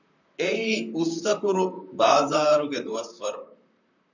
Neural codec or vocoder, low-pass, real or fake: vocoder, 44.1 kHz, 128 mel bands, Pupu-Vocoder; 7.2 kHz; fake